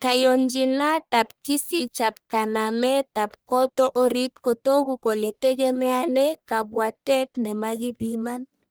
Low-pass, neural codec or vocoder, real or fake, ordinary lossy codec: none; codec, 44.1 kHz, 1.7 kbps, Pupu-Codec; fake; none